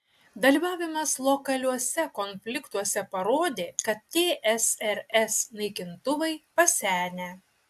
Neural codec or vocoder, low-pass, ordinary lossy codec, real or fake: none; 14.4 kHz; AAC, 96 kbps; real